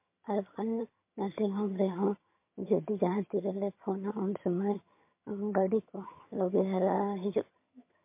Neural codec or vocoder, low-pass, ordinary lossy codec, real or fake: vocoder, 22.05 kHz, 80 mel bands, HiFi-GAN; 3.6 kHz; MP3, 24 kbps; fake